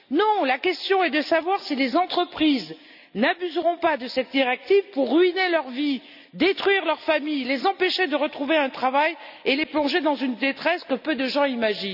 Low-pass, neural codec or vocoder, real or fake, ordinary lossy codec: 5.4 kHz; none; real; none